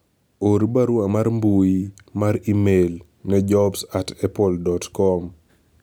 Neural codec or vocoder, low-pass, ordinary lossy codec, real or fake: none; none; none; real